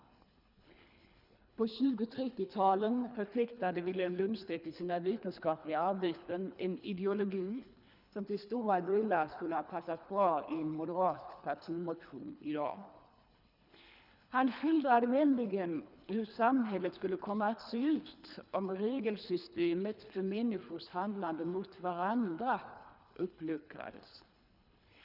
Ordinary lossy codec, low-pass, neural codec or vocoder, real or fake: none; 5.4 kHz; codec, 24 kHz, 3 kbps, HILCodec; fake